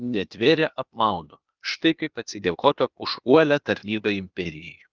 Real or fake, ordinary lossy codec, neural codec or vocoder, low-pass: fake; Opus, 24 kbps; codec, 16 kHz, 0.8 kbps, ZipCodec; 7.2 kHz